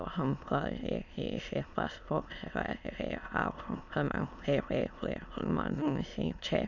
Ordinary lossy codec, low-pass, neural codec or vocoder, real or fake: none; 7.2 kHz; autoencoder, 22.05 kHz, a latent of 192 numbers a frame, VITS, trained on many speakers; fake